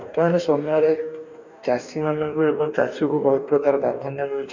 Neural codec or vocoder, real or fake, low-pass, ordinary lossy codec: codec, 44.1 kHz, 2.6 kbps, DAC; fake; 7.2 kHz; none